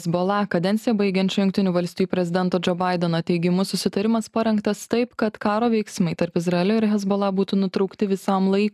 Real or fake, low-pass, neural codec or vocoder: real; 14.4 kHz; none